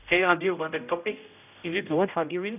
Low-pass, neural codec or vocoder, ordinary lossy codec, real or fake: 3.6 kHz; codec, 16 kHz, 0.5 kbps, X-Codec, HuBERT features, trained on general audio; none; fake